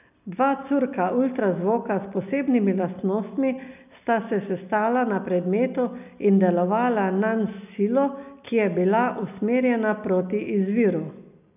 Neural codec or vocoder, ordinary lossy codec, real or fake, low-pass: none; none; real; 3.6 kHz